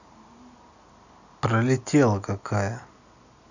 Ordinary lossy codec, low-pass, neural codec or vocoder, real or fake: none; 7.2 kHz; none; real